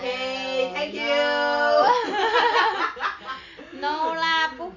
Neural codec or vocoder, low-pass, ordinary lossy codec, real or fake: none; 7.2 kHz; none; real